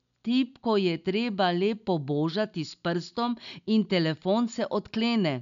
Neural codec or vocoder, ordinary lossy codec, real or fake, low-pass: none; none; real; 7.2 kHz